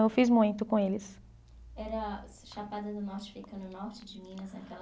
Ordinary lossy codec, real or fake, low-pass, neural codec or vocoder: none; real; none; none